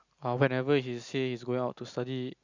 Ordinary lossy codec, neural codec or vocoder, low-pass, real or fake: Opus, 64 kbps; none; 7.2 kHz; real